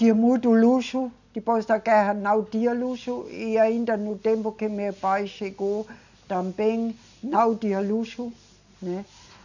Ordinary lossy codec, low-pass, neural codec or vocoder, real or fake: none; 7.2 kHz; none; real